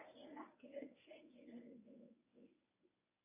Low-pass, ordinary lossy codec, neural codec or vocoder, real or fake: 3.6 kHz; MP3, 32 kbps; codec, 24 kHz, 0.9 kbps, WavTokenizer, medium speech release version 1; fake